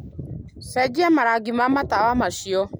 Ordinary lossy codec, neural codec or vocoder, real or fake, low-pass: none; none; real; none